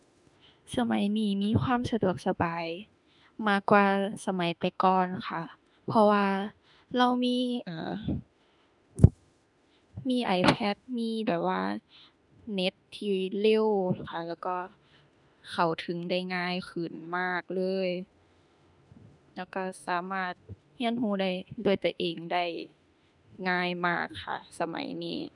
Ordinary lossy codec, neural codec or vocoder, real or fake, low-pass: none; autoencoder, 48 kHz, 32 numbers a frame, DAC-VAE, trained on Japanese speech; fake; 10.8 kHz